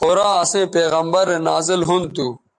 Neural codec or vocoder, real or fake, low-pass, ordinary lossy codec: vocoder, 44.1 kHz, 128 mel bands every 512 samples, BigVGAN v2; fake; 10.8 kHz; AAC, 64 kbps